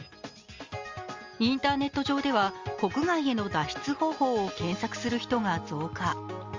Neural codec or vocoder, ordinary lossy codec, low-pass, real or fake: none; Opus, 32 kbps; 7.2 kHz; real